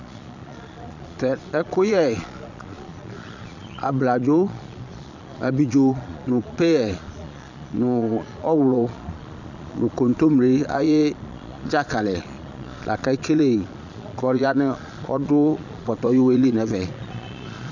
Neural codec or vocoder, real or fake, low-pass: vocoder, 22.05 kHz, 80 mel bands, Vocos; fake; 7.2 kHz